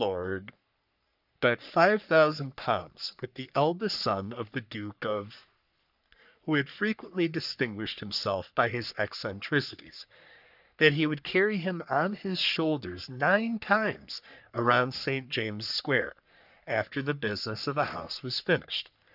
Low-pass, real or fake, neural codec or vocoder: 5.4 kHz; fake; codec, 44.1 kHz, 3.4 kbps, Pupu-Codec